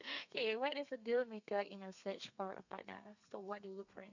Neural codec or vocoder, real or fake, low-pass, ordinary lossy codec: codec, 32 kHz, 1.9 kbps, SNAC; fake; 7.2 kHz; none